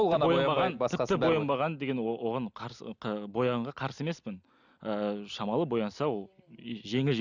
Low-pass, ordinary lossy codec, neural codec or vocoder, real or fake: 7.2 kHz; none; none; real